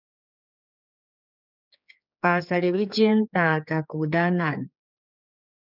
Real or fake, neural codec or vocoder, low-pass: fake; codec, 16 kHz, 4 kbps, X-Codec, HuBERT features, trained on general audio; 5.4 kHz